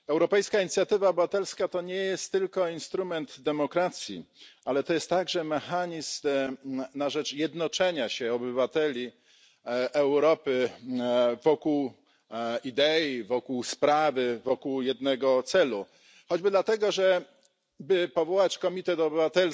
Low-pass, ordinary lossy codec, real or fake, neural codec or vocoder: none; none; real; none